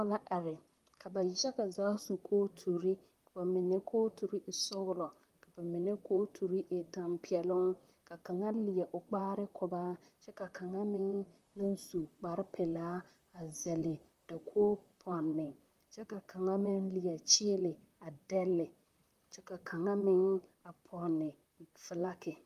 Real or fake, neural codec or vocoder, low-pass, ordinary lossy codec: fake; vocoder, 44.1 kHz, 128 mel bands, Pupu-Vocoder; 14.4 kHz; Opus, 32 kbps